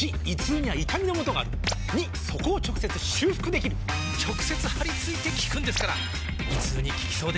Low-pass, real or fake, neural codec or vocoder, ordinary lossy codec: none; real; none; none